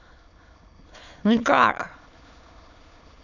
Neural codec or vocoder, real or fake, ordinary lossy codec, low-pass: autoencoder, 22.05 kHz, a latent of 192 numbers a frame, VITS, trained on many speakers; fake; none; 7.2 kHz